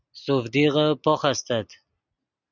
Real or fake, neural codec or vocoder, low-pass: real; none; 7.2 kHz